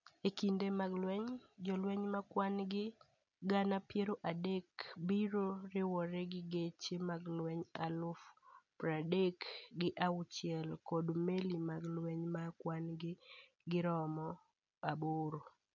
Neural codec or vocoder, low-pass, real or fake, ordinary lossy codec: none; 7.2 kHz; real; none